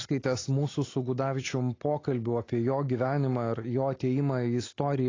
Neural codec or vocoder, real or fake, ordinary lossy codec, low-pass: none; real; AAC, 32 kbps; 7.2 kHz